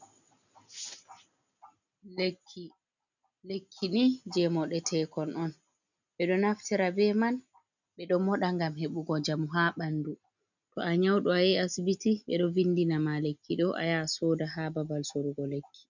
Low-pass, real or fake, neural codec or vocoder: 7.2 kHz; real; none